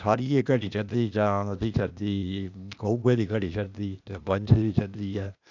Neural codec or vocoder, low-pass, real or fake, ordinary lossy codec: codec, 16 kHz, 0.8 kbps, ZipCodec; 7.2 kHz; fake; none